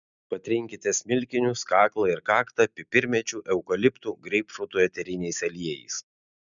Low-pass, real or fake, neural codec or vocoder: 7.2 kHz; real; none